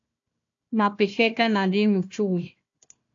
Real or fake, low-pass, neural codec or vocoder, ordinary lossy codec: fake; 7.2 kHz; codec, 16 kHz, 1 kbps, FunCodec, trained on Chinese and English, 50 frames a second; AAC, 48 kbps